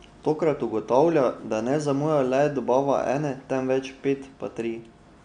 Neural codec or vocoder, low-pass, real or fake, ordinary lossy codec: none; 9.9 kHz; real; none